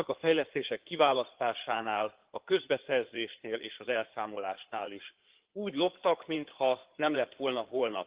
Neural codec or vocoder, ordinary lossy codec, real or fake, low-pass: codec, 16 kHz in and 24 kHz out, 2.2 kbps, FireRedTTS-2 codec; Opus, 16 kbps; fake; 3.6 kHz